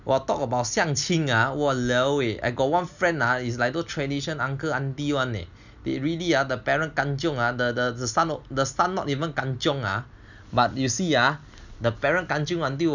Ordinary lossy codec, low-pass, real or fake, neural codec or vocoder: none; 7.2 kHz; real; none